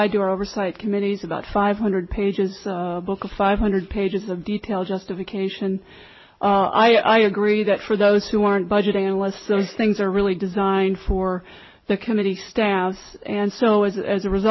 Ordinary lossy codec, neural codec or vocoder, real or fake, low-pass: MP3, 24 kbps; none; real; 7.2 kHz